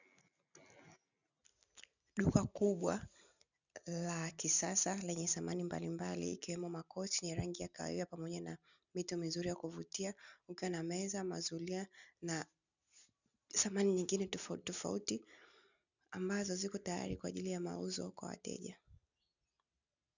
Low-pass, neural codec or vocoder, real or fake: 7.2 kHz; none; real